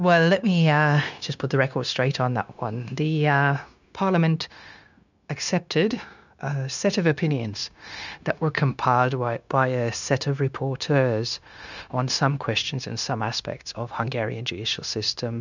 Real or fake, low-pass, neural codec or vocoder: fake; 7.2 kHz; codec, 16 kHz, 0.9 kbps, LongCat-Audio-Codec